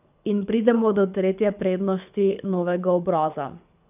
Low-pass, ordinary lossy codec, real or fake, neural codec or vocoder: 3.6 kHz; none; fake; codec, 24 kHz, 6 kbps, HILCodec